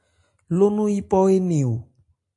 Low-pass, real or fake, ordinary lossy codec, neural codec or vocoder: 10.8 kHz; real; AAC, 48 kbps; none